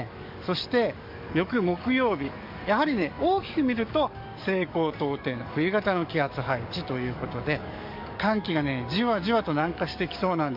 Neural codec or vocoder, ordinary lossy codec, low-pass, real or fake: codec, 44.1 kHz, 7.8 kbps, DAC; MP3, 48 kbps; 5.4 kHz; fake